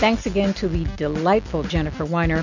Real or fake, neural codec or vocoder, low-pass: real; none; 7.2 kHz